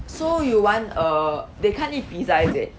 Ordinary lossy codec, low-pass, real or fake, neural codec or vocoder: none; none; real; none